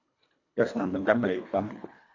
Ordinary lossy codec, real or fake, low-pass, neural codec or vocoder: AAC, 48 kbps; fake; 7.2 kHz; codec, 24 kHz, 1.5 kbps, HILCodec